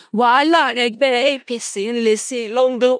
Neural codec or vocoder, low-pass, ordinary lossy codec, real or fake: codec, 16 kHz in and 24 kHz out, 0.4 kbps, LongCat-Audio-Codec, four codebook decoder; 9.9 kHz; none; fake